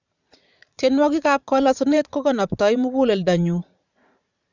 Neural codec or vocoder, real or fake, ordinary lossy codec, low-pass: vocoder, 44.1 kHz, 80 mel bands, Vocos; fake; none; 7.2 kHz